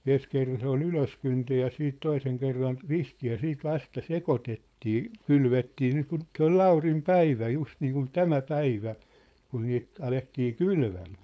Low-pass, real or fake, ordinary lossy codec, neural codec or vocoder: none; fake; none; codec, 16 kHz, 4.8 kbps, FACodec